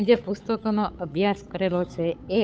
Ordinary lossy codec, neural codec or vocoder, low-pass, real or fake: none; codec, 16 kHz, 8 kbps, FunCodec, trained on Chinese and English, 25 frames a second; none; fake